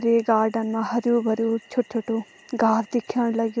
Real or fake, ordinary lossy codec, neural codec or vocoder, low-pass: real; none; none; none